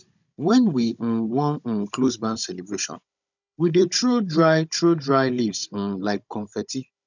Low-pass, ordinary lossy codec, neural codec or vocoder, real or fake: 7.2 kHz; none; codec, 16 kHz, 16 kbps, FunCodec, trained on Chinese and English, 50 frames a second; fake